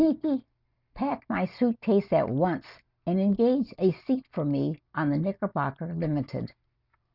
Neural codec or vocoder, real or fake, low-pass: none; real; 5.4 kHz